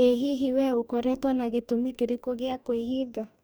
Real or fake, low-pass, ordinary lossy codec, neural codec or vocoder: fake; none; none; codec, 44.1 kHz, 2.6 kbps, DAC